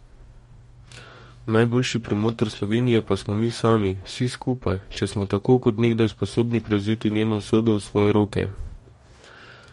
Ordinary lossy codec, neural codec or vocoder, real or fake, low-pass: MP3, 48 kbps; codec, 44.1 kHz, 2.6 kbps, DAC; fake; 19.8 kHz